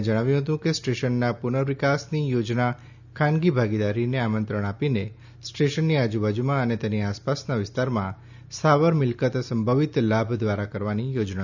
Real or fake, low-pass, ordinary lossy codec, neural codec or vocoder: real; 7.2 kHz; none; none